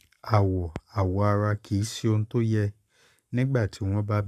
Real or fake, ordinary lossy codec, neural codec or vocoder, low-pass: fake; none; codec, 44.1 kHz, 7.8 kbps, Pupu-Codec; 14.4 kHz